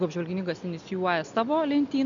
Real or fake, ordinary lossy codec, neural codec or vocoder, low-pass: real; MP3, 64 kbps; none; 7.2 kHz